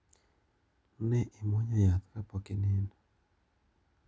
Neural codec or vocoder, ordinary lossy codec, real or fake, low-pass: none; none; real; none